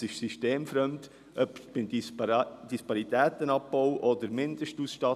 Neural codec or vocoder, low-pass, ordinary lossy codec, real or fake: none; 14.4 kHz; none; real